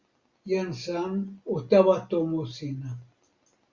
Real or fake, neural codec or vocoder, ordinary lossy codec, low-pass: real; none; Opus, 64 kbps; 7.2 kHz